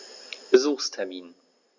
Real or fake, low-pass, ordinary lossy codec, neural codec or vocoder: real; 7.2 kHz; Opus, 64 kbps; none